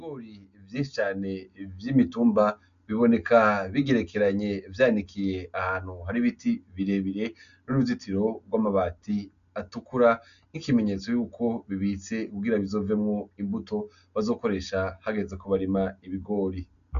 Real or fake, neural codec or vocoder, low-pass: real; none; 7.2 kHz